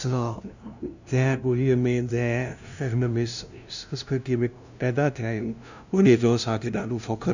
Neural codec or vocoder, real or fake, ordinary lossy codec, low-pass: codec, 16 kHz, 0.5 kbps, FunCodec, trained on LibriTTS, 25 frames a second; fake; none; 7.2 kHz